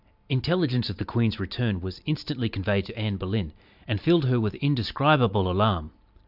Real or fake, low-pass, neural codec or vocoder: fake; 5.4 kHz; vocoder, 44.1 kHz, 128 mel bands every 512 samples, BigVGAN v2